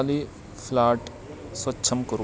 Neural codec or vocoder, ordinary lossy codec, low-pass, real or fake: none; none; none; real